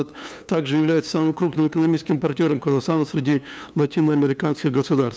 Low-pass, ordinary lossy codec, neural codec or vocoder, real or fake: none; none; codec, 16 kHz, 2 kbps, FunCodec, trained on LibriTTS, 25 frames a second; fake